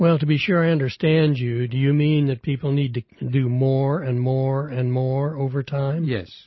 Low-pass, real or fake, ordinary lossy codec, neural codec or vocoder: 7.2 kHz; real; MP3, 24 kbps; none